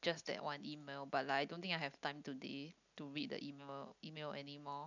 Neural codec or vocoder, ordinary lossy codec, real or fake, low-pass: none; none; real; 7.2 kHz